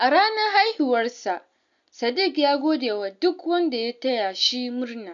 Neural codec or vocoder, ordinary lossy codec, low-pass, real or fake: none; none; 7.2 kHz; real